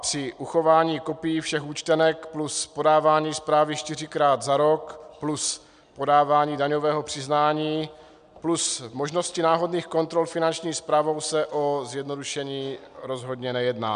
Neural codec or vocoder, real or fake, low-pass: none; real; 9.9 kHz